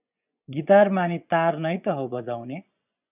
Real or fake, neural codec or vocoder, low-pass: fake; vocoder, 24 kHz, 100 mel bands, Vocos; 3.6 kHz